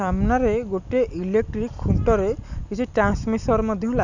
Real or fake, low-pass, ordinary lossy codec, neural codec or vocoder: real; 7.2 kHz; none; none